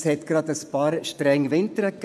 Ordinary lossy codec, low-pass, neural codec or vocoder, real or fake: none; none; vocoder, 24 kHz, 100 mel bands, Vocos; fake